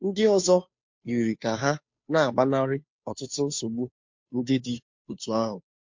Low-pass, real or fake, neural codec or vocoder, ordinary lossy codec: 7.2 kHz; fake; codec, 16 kHz, 2 kbps, FunCodec, trained on Chinese and English, 25 frames a second; MP3, 48 kbps